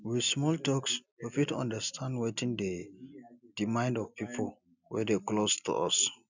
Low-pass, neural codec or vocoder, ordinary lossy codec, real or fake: 7.2 kHz; none; none; real